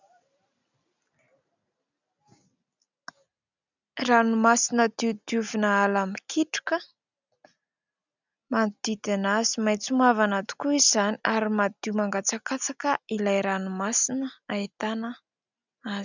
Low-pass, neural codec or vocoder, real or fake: 7.2 kHz; none; real